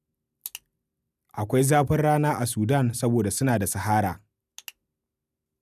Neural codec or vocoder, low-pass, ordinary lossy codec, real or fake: none; 14.4 kHz; none; real